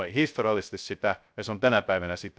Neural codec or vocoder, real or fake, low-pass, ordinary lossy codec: codec, 16 kHz, 0.3 kbps, FocalCodec; fake; none; none